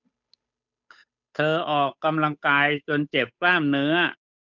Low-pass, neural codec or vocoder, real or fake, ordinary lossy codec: 7.2 kHz; codec, 16 kHz, 8 kbps, FunCodec, trained on Chinese and English, 25 frames a second; fake; none